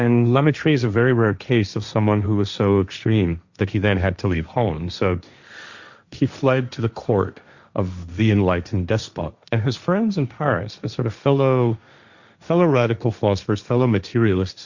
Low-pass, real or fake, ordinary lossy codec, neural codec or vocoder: 7.2 kHz; fake; Opus, 64 kbps; codec, 16 kHz, 1.1 kbps, Voila-Tokenizer